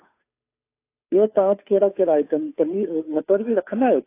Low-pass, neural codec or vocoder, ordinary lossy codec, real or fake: 3.6 kHz; codec, 16 kHz, 2 kbps, FunCodec, trained on Chinese and English, 25 frames a second; AAC, 24 kbps; fake